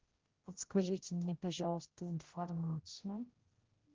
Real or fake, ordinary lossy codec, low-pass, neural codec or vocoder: fake; Opus, 16 kbps; 7.2 kHz; codec, 16 kHz, 0.5 kbps, X-Codec, HuBERT features, trained on general audio